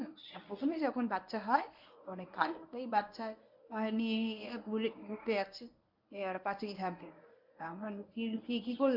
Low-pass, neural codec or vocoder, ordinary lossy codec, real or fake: 5.4 kHz; codec, 24 kHz, 0.9 kbps, WavTokenizer, medium speech release version 1; none; fake